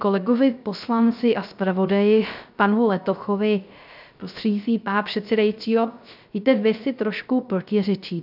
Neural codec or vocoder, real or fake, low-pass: codec, 16 kHz, 0.3 kbps, FocalCodec; fake; 5.4 kHz